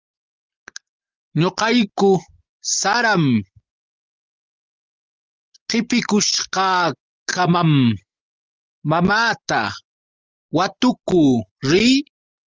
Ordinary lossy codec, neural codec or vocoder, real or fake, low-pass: Opus, 24 kbps; none; real; 7.2 kHz